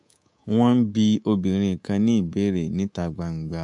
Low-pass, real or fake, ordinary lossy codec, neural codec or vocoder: 10.8 kHz; fake; MP3, 64 kbps; codec, 24 kHz, 3.1 kbps, DualCodec